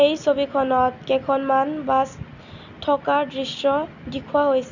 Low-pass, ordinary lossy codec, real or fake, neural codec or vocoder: 7.2 kHz; none; real; none